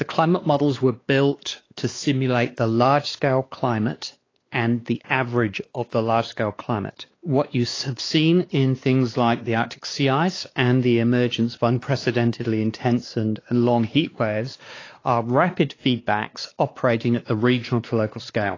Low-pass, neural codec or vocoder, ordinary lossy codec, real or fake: 7.2 kHz; codec, 16 kHz, 2 kbps, X-Codec, WavLM features, trained on Multilingual LibriSpeech; AAC, 32 kbps; fake